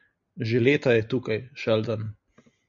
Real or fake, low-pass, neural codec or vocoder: real; 7.2 kHz; none